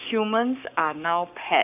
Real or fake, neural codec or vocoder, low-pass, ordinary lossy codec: fake; codec, 44.1 kHz, 7.8 kbps, Pupu-Codec; 3.6 kHz; none